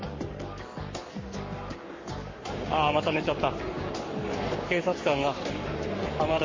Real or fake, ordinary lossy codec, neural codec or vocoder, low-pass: fake; MP3, 32 kbps; codec, 44.1 kHz, 7.8 kbps, Pupu-Codec; 7.2 kHz